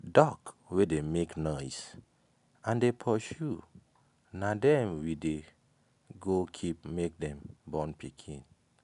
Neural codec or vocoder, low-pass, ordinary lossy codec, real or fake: none; 10.8 kHz; none; real